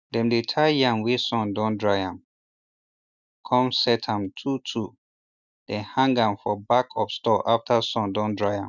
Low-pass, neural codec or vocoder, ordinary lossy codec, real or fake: 7.2 kHz; none; none; real